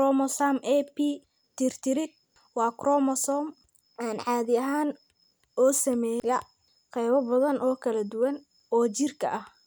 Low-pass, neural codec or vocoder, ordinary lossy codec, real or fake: none; none; none; real